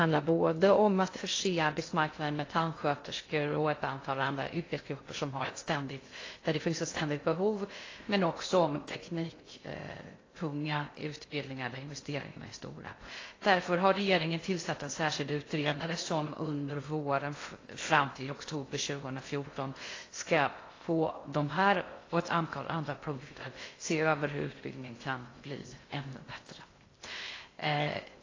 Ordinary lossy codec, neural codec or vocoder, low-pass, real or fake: AAC, 32 kbps; codec, 16 kHz in and 24 kHz out, 0.6 kbps, FocalCodec, streaming, 2048 codes; 7.2 kHz; fake